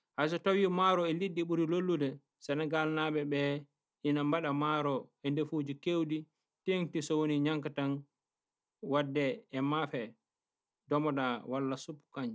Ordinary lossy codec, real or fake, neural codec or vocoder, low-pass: none; real; none; none